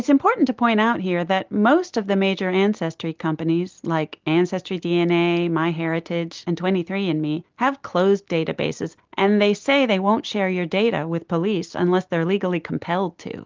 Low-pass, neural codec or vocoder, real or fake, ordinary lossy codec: 7.2 kHz; none; real; Opus, 32 kbps